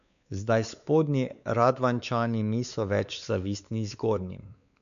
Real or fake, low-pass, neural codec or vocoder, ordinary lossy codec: fake; 7.2 kHz; codec, 16 kHz, 4 kbps, X-Codec, WavLM features, trained on Multilingual LibriSpeech; none